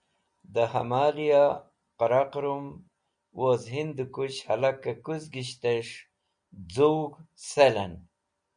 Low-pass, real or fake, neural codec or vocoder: 9.9 kHz; real; none